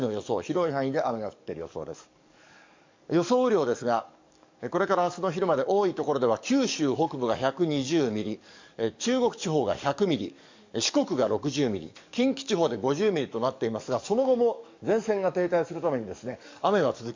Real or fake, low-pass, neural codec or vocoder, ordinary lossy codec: fake; 7.2 kHz; codec, 44.1 kHz, 7.8 kbps, DAC; MP3, 64 kbps